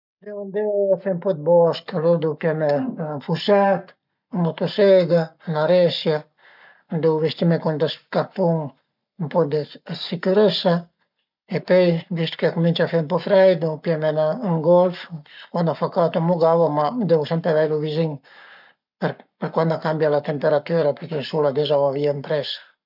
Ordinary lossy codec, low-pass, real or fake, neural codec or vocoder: none; 5.4 kHz; fake; codec, 44.1 kHz, 7.8 kbps, Pupu-Codec